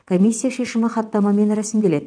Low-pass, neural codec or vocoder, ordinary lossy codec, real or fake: 9.9 kHz; vocoder, 22.05 kHz, 80 mel bands, WaveNeXt; none; fake